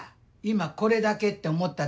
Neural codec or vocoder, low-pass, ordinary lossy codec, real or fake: none; none; none; real